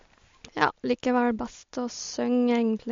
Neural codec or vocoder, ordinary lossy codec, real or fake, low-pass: none; MP3, 48 kbps; real; 7.2 kHz